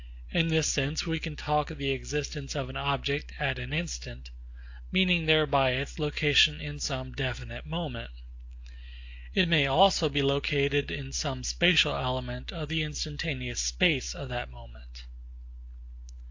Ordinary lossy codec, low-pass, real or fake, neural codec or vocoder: AAC, 48 kbps; 7.2 kHz; real; none